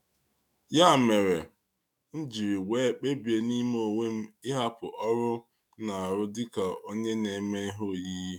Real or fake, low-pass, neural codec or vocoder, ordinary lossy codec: fake; none; autoencoder, 48 kHz, 128 numbers a frame, DAC-VAE, trained on Japanese speech; none